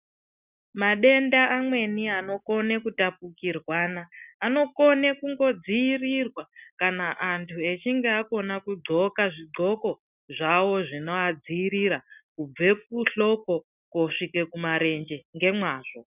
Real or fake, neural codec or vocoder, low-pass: real; none; 3.6 kHz